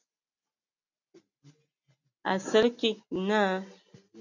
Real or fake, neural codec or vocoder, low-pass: real; none; 7.2 kHz